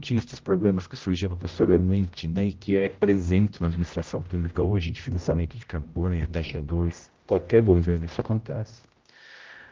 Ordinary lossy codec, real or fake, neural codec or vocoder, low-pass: Opus, 32 kbps; fake; codec, 16 kHz, 0.5 kbps, X-Codec, HuBERT features, trained on general audio; 7.2 kHz